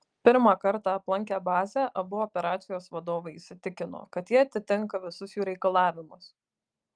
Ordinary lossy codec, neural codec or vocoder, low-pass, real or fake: Opus, 32 kbps; none; 9.9 kHz; real